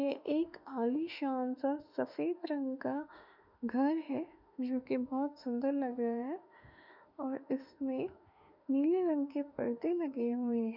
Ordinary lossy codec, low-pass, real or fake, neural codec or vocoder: none; 5.4 kHz; fake; autoencoder, 48 kHz, 32 numbers a frame, DAC-VAE, trained on Japanese speech